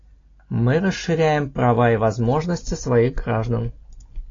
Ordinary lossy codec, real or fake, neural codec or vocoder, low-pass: AAC, 32 kbps; real; none; 7.2 kHz